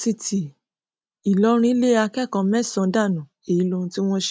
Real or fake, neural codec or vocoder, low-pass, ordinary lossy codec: real; none; none; none